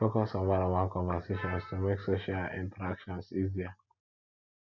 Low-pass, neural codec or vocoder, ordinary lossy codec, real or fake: 7.2 kHz; none; none; real